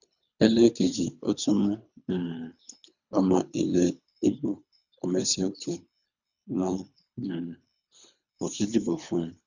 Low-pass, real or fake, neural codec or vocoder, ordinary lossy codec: 7.2 kHz; fake; codec, 24 kHz, 3 kbps, HILCodec; none